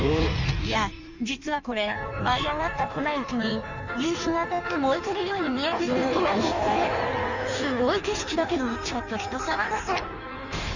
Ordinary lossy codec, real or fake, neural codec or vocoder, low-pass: none; fake; codec, 16 kHz in and 24 kHz out, 1.1 kbps, FireRedTTS-2 codec; 7.2 kHz